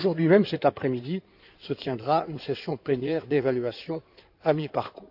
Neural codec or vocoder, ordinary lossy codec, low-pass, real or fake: codec, 16 kHz in and 24 kHz out, 2.2 kbps, FireRedTTS-2 codec; none; 5.4 kHz; fake